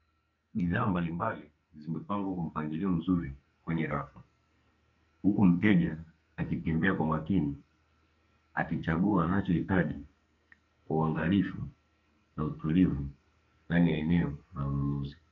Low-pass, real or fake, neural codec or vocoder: 7.2 kHz; fake; codec, 44.1 kHz, 2.6 kbps, SNAC